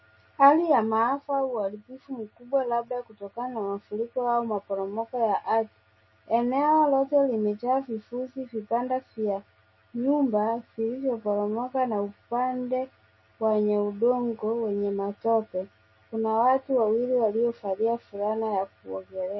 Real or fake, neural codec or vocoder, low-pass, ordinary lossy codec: real; none; 7.2 kHz; MP3, 24 kbps